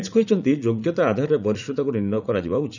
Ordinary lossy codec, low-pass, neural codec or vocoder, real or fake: none; 7.2 kHz; vocoder, 44.1 kHz, 80 mel bands, Vocos; fake